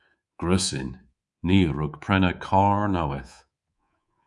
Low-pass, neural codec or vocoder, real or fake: 10.8 kHz; codec, 24 kHz, 3.1 kbps, DualCodec; fake